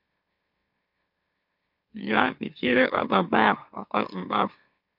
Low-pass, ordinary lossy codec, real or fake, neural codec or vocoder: 5.4 kHz; MP3, 48 kbps; fake; autoencoder, 44.1 kHz, a latent of 192 numbers a frame, MeloTTS